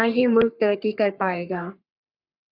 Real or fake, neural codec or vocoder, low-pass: fake; codec, 44.1 kHz, 3.4 kbps, Pupu-Codec; 5.4 kHz